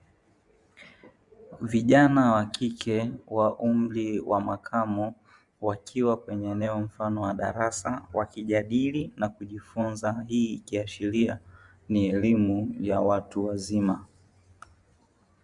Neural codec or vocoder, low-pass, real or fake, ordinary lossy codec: vocoder, 24 kHz, 100 mel bands, Vocos; 10.8 kHz; fake; Opus, 64 kbps